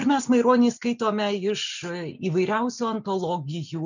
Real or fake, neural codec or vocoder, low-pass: real; none; 7.2 kHz